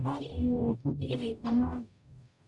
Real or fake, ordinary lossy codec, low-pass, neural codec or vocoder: fake; none; 10.8 kHz; codec, 44.1 kHz, 0.9 kbps, DAC